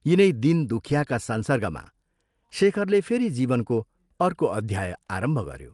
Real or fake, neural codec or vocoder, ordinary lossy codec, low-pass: real; none; Opus, 24 kbps; 10.8 kHz